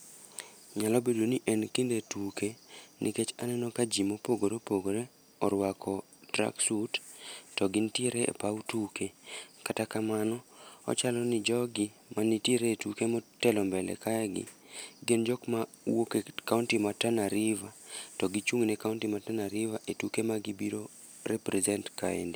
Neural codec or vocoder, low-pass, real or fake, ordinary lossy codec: none; none; real; none